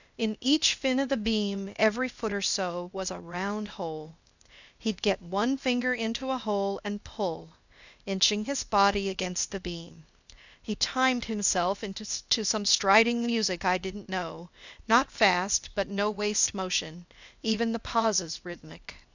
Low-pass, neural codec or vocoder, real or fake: 7.2 kHz; codec, 16 kHz, 0.8 kbps, ZipCodec; fake